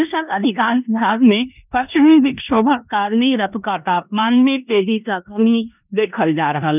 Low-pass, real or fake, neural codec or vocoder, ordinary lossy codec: 3.6 kHz; fake; codec, 16 kHz in and 24 kHz out, 0.9 kbps, LongCat-Audio-Codec, four codebook decoder; none